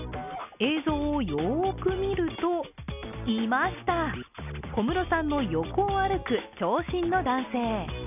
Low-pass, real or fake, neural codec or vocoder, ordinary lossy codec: 3.6 kHz; real; none; none